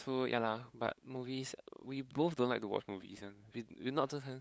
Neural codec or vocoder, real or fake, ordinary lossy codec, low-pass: codec, 16 kHz, 8 kbps, FunCodec, trained on LibriTTS, 25 frames a second; fake; none; none